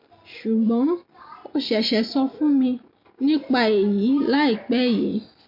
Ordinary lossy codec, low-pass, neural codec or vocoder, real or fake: MP3, 32 kbps; 5.4 kHz; none; real